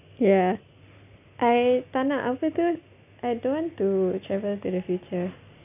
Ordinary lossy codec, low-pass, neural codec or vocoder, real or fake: none; 3.6 kHz; none; real